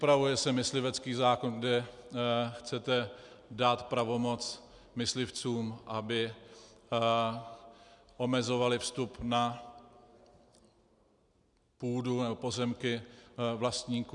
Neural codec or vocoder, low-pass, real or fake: none; 10.8 kHz; real